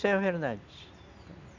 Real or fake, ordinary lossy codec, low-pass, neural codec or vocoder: real; none; 7.2 kHz; none